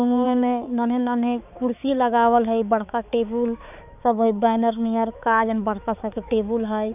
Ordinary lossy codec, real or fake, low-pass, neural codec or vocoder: none; fake; 3.6 kHz; codec, 16 kHz, 4 kbps, X-Codec, HuBERT features, trained on balanced general audio